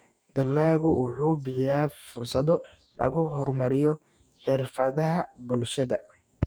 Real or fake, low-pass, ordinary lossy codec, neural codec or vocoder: fake; none; none; codec, 44.1 kHz, 2.6 kbps, DAC